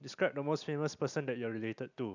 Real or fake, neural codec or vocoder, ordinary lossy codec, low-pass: real; none; none; 7.2 kHz